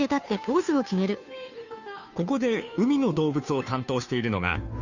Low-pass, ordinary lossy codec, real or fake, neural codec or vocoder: 7.2 kHz; none; fake; codec, 16 kHz, 2 kbps, FunCodec, trained on Chinese and English, 25 frames a second